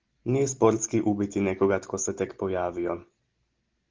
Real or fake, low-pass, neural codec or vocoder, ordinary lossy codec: real; 7.2 kHz; none; Opus, 16 kbps